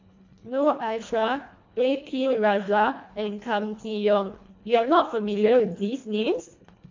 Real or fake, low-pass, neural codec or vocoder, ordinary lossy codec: fake; 7.2 kHz; codec, 24 kHz, 1.5 kbps, HILCodec; MP3, 48 kbps